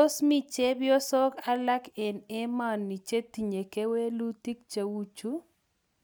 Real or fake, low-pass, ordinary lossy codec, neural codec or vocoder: real; none; none; none